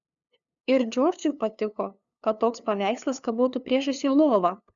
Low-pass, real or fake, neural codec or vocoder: 7.2 kHz; fake; codec, 16 kHz, 2 kbps, FunCodec, trained on LibriTTS, 25 frames a second